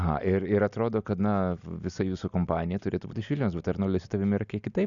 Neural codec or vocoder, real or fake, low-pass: none; real; 7.2 kHz